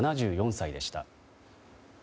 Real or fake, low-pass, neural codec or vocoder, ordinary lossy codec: real; none; none; none